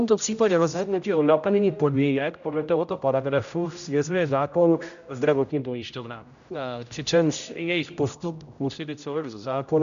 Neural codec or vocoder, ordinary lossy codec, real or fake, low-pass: codec, 16 kHz, 0.5 kbps, X-Codec, HuBERT features, trained on general audio; MP3, 96 kbps; fake; 7.2 kHz